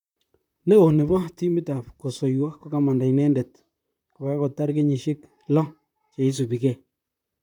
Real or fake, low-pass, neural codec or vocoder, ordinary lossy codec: fake; 19.8 kHz; vocoder, 44.1 kHz, 128 mel bands, Pupu-Vocoder; none